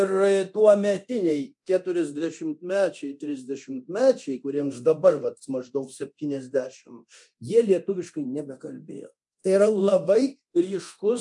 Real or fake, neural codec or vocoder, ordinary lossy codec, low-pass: fake; codec, 24 kHz, 0.9 kbps, DualCodec; MP3, 64 kbps; 10.8 kHz